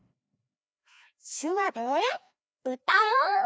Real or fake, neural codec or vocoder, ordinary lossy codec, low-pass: fake; codec, 16 kHz, 1 kbps, FreqCodec, larger model; none; none